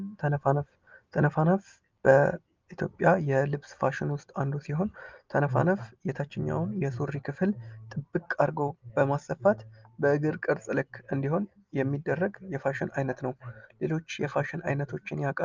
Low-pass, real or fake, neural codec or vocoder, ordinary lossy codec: 7.2 kHz; real; none; Opus, 24 kbps